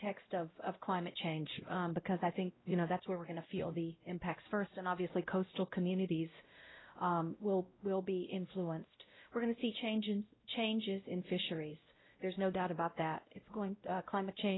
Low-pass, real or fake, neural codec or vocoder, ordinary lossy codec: 7.2 kHz; fake; codec, 16 kHz, 0.5 kbps, X-Codec, WavLM features, trained on Multilingual LibriSpeech; AAC, 16 kbps